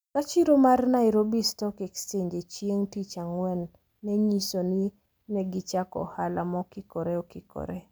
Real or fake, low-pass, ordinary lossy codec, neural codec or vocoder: real; none; none; none